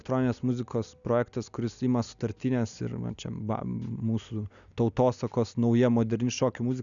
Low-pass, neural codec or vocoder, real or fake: 7.2 kHz; none; real